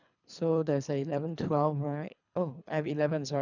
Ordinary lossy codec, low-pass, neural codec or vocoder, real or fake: none; 7.2 kHz; codec, 24 kHz, 3 kbps, HILCodec; fake